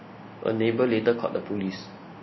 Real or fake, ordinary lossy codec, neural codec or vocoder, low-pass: real; MP3, 24 kbps; none; 7.2 kHz